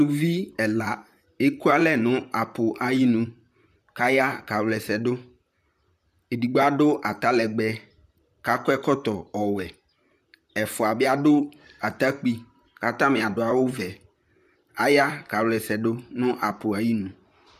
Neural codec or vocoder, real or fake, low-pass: vocoder, 44.1 kHz, 128 mel bands, Pupu-Vocoder; fake; 14.4 kHz